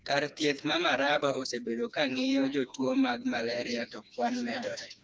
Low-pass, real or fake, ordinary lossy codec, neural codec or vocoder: none; fake; none; codec, 16 kHz, 2 kbps, FreqCodec, smaller model